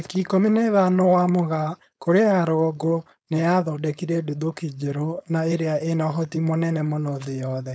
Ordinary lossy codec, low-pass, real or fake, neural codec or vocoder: none; none; fake; codec, 16 kHz, 4.8 kbps, FACodec